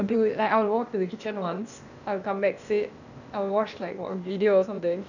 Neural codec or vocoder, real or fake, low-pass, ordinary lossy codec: codec, 16 kHz, 0.8 kbps, ZipCodec; fake; 7.2 kHz; none